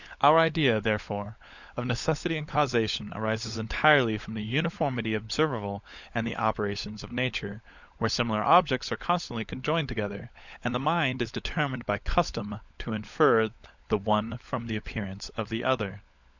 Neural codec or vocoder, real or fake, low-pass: codec, 16 kHz, 16 kbps, FunCodec, trained on LibriTTS, 50 frames a second; fake; 7.2 kHz